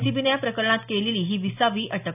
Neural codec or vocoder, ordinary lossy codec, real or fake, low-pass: none; none; real; 3.6 kHz